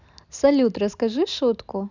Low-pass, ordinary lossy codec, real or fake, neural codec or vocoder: 7.2 kHz; none; real; none